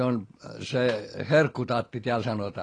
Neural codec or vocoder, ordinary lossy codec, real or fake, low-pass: none; AAC, 32 kbps; real; 9.9 kHz